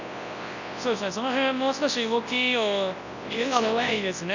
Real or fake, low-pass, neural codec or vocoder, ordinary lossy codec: fake; 7.2 kHz; codec, 24 kHz, 0.9 kbps, WavTokenizer, large speech release; none